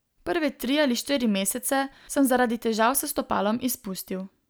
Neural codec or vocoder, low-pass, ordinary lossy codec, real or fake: none; none; none; real